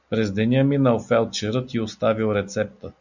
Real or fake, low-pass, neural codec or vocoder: real; 7.2 kHz; none